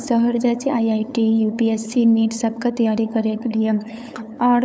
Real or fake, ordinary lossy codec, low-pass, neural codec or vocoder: fake; none; none; codec, 16 kHz, 8 kbps, FunCodec, trained on LibriTTS, 25 frames a second